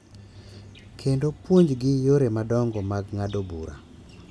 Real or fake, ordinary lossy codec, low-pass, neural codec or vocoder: real; none; none; none